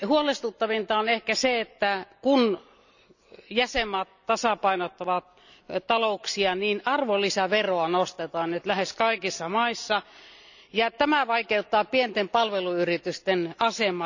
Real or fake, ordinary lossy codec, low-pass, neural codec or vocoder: real; none; 7.2 kHz; none